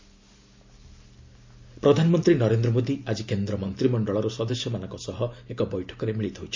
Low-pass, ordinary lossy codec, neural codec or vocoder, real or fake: 7.2 kHz; MP3, 64 kbps; none; real